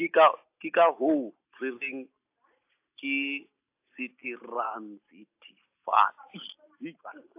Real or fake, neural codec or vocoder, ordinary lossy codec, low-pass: real; none; AAC, 32 kbps; 3.6 kHz